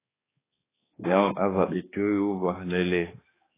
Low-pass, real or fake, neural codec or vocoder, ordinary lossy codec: 3.6 kHz; fake; codec, 16 kHz, 2 kbps, X-Codec, HuBERT features, trained on balanced general audio; AAC, 16 kbps